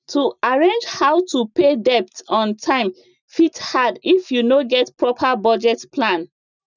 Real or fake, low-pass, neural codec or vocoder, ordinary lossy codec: real; 7.2 kHz; none; none